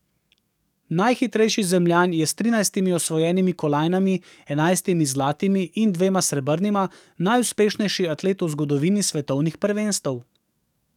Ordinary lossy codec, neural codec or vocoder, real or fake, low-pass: none; codec, 44.1 kHz, 7.8 kbps, DAC; fake; 19.8 kHz